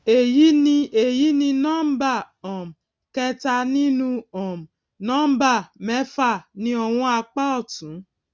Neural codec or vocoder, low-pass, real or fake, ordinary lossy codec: none; none; real; none